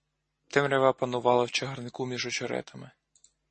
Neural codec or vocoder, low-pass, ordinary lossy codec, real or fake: none; 10.8 kHz; MP3, 32 kbps; real